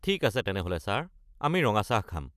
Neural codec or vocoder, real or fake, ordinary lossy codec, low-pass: none; real; none; 14.4 kHz